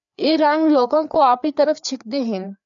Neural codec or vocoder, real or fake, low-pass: codec, 16 kHz, 4 kbps, FreqCodec, larger model; fake; 7.2 kHz